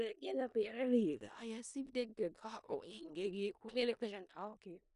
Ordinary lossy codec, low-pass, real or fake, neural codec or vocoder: none; 10.8 kHz; fake; codec, 16 kHz in and 24 kHz out, 0.4 kbps, LongCat-Audio-Codec, four codebook decoder